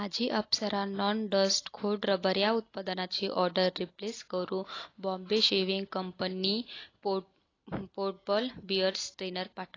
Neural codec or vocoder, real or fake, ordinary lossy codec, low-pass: none; real; AAC, 32 kbps; 7.2 kHz